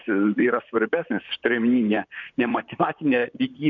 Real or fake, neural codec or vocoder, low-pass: fake; vocoder, 44.1 kHz, 128 mel bands, Pupu-Vocoder; 7.2 kHz